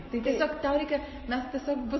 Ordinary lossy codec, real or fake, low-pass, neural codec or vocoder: MP3, 24 kbps; real; 7.2 kHz; none